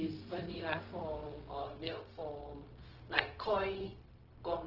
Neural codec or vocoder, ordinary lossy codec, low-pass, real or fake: codec, 16 kHz, 0.4 kbps, LongCat-Audio-Codec; Opus, 16 kbps; 5.4 kHz; fake